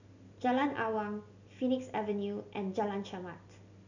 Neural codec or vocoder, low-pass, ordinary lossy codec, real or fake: none; 7.2 kHz; none; real